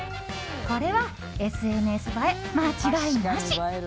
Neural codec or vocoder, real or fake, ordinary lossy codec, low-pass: none; real; none; none